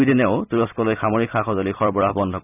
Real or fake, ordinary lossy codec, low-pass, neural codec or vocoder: fake; none; 3.6 kHz; vocoder, 44.1 kHz, 128 mel bands every 256 samples, BigVGAN v2